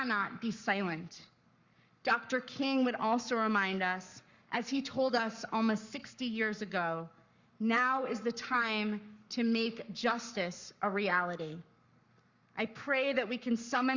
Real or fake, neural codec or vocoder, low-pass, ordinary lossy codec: fake; codec, 44.1 kHz, 7.8 kbps, Pupu-Codec; 7.2 kHz; Opus, 64 kbps